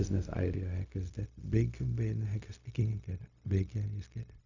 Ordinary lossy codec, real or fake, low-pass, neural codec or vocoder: none; fake; 7.2 kHz; codec, 16 kHz, 0.4 kbps, LongCat-Audio-Codec